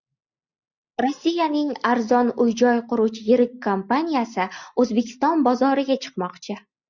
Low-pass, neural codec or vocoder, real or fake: 7.2 kHz; none; real